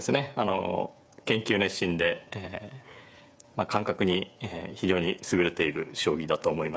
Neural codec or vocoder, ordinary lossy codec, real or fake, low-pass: codec, 16 kHz, 8 kbps, FreqCodec, smaller model; none; fake; none